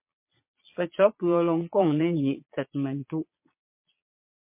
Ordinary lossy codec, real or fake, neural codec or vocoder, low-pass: MP3, 24 kbps; fake; vocoder, 22.05 kHz, 80 mel bands, Vocos; 3.6 kHz